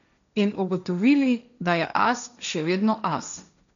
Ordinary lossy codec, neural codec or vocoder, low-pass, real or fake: none; codec, 16 kHz, 1.1 kbps, Voila-Tokenizer; 7.2 kHz; fake